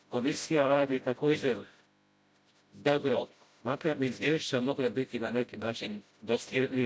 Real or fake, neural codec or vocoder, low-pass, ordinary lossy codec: fake; codec, 16 kHz, 0.5 kbps, FreqCodec, smaller model; none; none